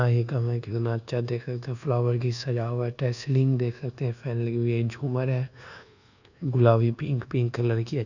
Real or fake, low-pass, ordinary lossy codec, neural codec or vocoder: fake; 7.2 kHz; none; codec, 24 kHz, 1.2 kbps, DualCodec